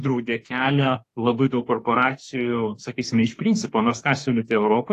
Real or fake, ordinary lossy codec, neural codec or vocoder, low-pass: fake; AAC, 48 kbps; autoencoder, 48 kHz, 32 numbers a frame, DAC-VAE, trained on Japanese speech; 14.4 kHz